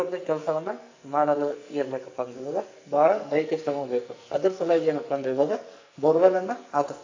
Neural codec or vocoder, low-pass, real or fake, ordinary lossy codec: codec, 44.1 kHz, 2.6 kbps, SNAC; 7.2 kHz; fake; AAC, 48 kbps